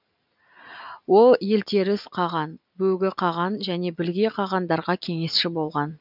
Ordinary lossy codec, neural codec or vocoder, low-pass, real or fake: none; none; 5.4 kHz; real